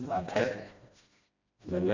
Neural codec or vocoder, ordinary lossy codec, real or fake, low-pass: codec, 16 kHz, 1 kbps, FreqCodec, smaller model; MP3, 64 kbps; fake; 7.2 kHz